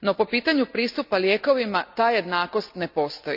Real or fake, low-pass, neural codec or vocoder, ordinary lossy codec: real; 5.4 kHz; none; none